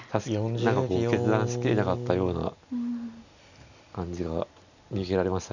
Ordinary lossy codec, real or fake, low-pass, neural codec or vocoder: none; real; 7.2 kHz; none